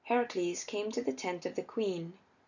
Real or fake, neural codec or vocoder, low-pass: real; none; 7.2 kHz